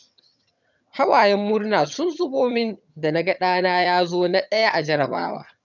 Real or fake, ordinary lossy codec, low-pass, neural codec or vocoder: fake; none; 7.2 kHz; vocoder, 22.05 kHz, 80 mel bands, HiFi-GAN